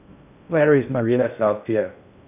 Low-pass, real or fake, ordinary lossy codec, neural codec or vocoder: 3.6 kHz; fake; none; codec, 16 kHz in and 24 kHz out, 0.6 kbps, FocalCodec, streaming, 4096 codes